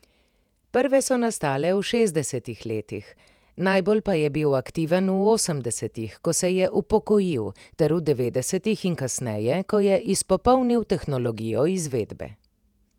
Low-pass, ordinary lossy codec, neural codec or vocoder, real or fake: 19.8 kHz; none; vocoder, 48 kHz, 128 mel bands, Vocos; fake